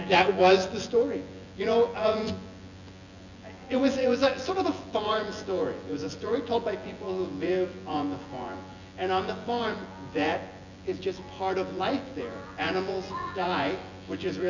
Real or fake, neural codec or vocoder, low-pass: fake; vocoder, 24 kHz, 100 mel bands, Vocos; 7.2 kHz